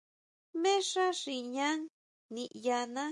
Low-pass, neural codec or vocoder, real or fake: 10.8 kHz; none; real